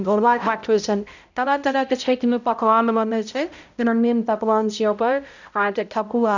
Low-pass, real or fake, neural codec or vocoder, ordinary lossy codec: 7.2 kHz; fake; codec, 16 kHz, 0.5 kbps, X-Codec, HuBERT features, trained on balanced general audio; none